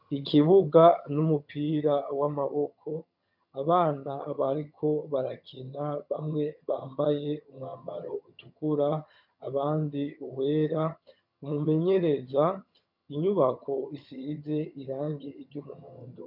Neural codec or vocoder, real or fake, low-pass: vocoder, 22.05 kHz, 80 mel bands, HiFi-GAN; fake; 5.4 kHz